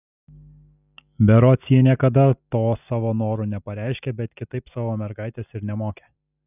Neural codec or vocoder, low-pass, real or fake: none; 3.6 kHz; real